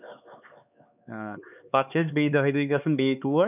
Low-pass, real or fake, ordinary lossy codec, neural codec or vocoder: 3.6 kHz; fake; none; codec, 16 kHz, 4 kbps, X-Codec, HuBERT features, trained on LibriSpeech